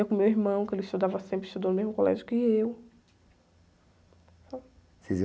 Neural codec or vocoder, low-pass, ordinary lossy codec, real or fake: none; none; none; real